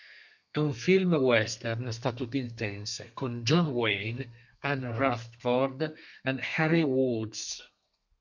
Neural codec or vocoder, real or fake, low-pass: codec, 32 kHz, 1.9 kbps, SNAC; fake; 7.2 kHz